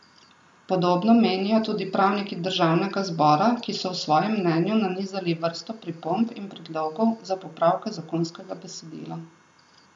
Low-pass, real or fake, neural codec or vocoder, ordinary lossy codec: 10.8 kHz; real; none; none